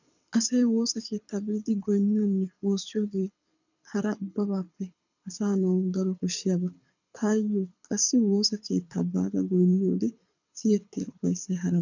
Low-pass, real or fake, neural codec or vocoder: 7.2 kHz; fake; codec, 24 kHz, 6 kbps, HILCodec